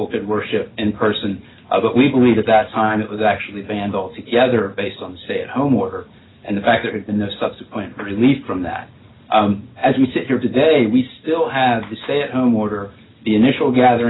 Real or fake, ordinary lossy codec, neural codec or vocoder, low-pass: real; AAC, 16 kbps; none; 7.2 kHz